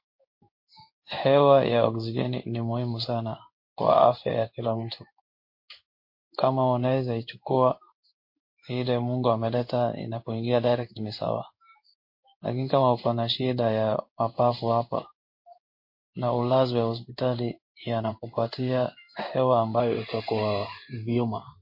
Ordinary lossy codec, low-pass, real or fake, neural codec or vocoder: MP3, 32 kbps; 5.4 kHz; fake; codec, 16 kHz in and 24 kHz out, 1 kbps, XY-Tokenizer